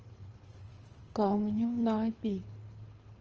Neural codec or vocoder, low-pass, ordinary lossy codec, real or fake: codec, 24 kHz, 6 kbps, HILCodec; 7.2 kHz; Opus, 24 kbps; fake